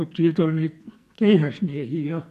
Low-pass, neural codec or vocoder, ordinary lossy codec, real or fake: 14.4 kHz; codec, 44.1 kHz, 2.6 kbps, SNAC; none; fake